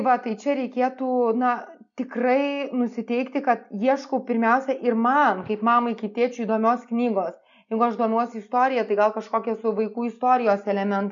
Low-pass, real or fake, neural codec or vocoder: 7.2 kHz; real; none